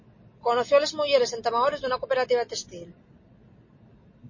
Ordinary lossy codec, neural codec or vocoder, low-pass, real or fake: MP3, 32 kbps; none; 7.2 kHz; real